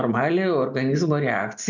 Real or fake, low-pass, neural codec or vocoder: real; 7.2 kHz; none